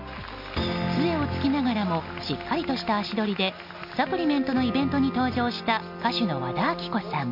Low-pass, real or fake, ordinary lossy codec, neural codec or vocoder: 5.4 kHz; real; none; none